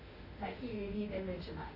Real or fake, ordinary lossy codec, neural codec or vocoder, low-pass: fake; none; autoencoder, 48 kHz, 32 numbers a frame, DAC-VAE, trained on Japanese speech; 5.4 kHz